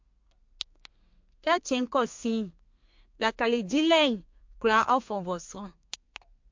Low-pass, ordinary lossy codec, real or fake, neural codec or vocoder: 7.2 kHz; MP3, 48 kbps; fake; codec, 32 kHz, 1.9 kbps, SNAC